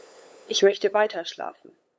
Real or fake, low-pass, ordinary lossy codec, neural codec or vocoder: fake; none; none; codec, 16 kHz, 8 kbps, FunCodec, trained on LibriTTS, 25 frames a second